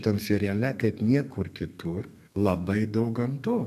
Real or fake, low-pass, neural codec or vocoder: fake; 14.4 kHz; codec, 32 kHz, 1.9 kbps, SNAC